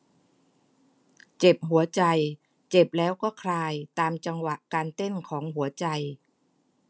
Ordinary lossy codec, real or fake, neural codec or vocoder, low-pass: none; real; none; none